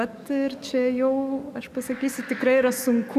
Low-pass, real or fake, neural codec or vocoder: 14.4 kHz; fake; codec, 44.1 kHz, 7.8 kbps, DAC